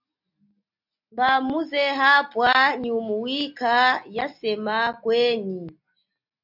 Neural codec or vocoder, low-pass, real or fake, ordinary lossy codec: none; 5.4 kHz; real; MP3, 48 kbps